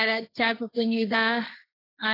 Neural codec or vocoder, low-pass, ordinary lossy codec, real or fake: codec, 16 kHz, 1.1 kbps, Voila-Tokenizer; 5.4 kHz; AAC, 24 kbps; fake